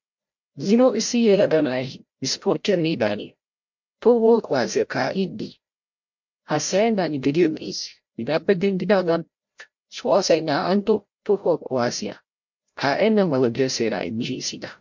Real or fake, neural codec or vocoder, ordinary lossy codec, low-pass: fake; codec, 16 kHz, 0.5 kbps, FreqCodec, larger model; MP3, 48 kbps; 7.2 kHz